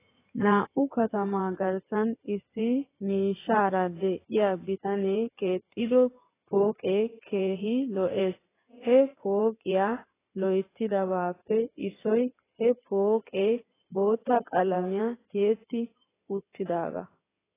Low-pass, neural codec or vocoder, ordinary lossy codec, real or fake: 3.6 kHz; codec, 16 kHz in and 24 kHz out, 2.2 kbps, FireRedTTS-2 codec; AAC, 16 kbps; fake